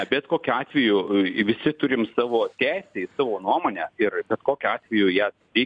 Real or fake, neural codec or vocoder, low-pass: real; none; 9.9 kHz